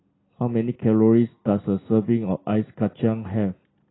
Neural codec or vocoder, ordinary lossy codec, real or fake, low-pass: none; AAC, 16 kbps; real; 7.2 kHz